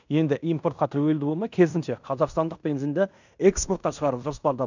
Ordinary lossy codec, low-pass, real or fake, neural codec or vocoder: none; 7.2 kHz; fake; codec, 16 kHz in and 24 kHz out, 0.9 kbps, LongCat-Audio-Codec, fine tuned four codebook decoder